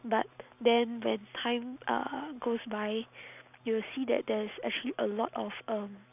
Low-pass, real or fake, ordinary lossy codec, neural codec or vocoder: 3.6 kHz; real; none; none